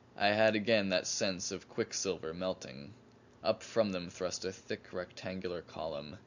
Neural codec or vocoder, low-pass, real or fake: none; 7.2 kHz; real